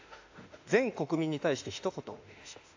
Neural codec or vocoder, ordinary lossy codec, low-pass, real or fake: autoencoder, 48 kHz, 32 numbers a frame, DAC-VAE, trained on Japanese speech; AAC, 48 kbps; 7.2 kHz; fake